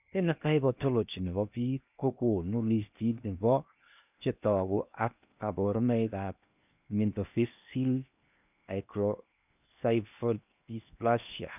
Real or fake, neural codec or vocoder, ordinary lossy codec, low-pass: fake; codec, 16 kHz in and 24 kHz out, 0.8 kbps, FocalCodec, streaming, 65536 codes; none; 3.6 kHz